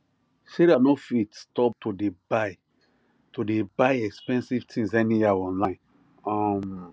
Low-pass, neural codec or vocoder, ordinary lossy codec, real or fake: none; none; none; real